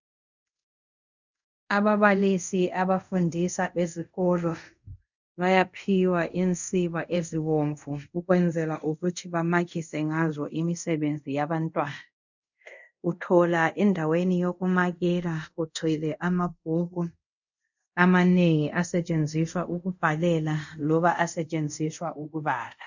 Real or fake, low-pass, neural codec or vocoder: fake; 7.2 kHz; codec, 24 kHz, 0.5 kbps, DualCodec